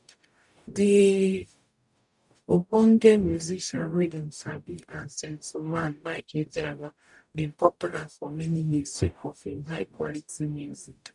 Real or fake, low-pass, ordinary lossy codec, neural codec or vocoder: fake; 10.8 kHz; none; codec, 44.1 kHz, 0.9 kbps, DAC